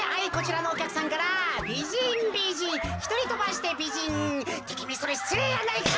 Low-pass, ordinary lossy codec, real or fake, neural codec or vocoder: none; none; real; none